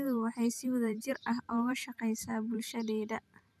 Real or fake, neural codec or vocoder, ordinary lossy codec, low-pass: fake; vocoder, 44.1 kHz, 128 mel bands every 512 samples, BigVGAN v2; none; 14.4 kHz